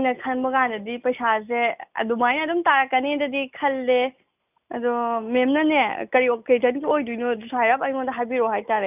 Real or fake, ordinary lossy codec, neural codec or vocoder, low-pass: real; none; none; 3.6 kHz